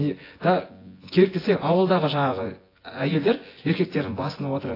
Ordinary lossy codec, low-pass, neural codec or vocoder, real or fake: AAC, 24 kbps; 5.4 kHz; vocoder, 24 kHz, 100 mel bands, Vocos; fake